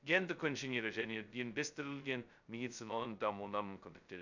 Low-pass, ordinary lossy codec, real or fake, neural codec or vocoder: 7.2 kHz; none; fake; codec, 16 kHz, 0.2 kbps, FocalCodec